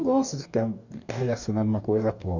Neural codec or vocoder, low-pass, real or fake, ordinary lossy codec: codec, 44.1 kHz, 2.6 kbps, DAC; 7.2 kHz; fake; none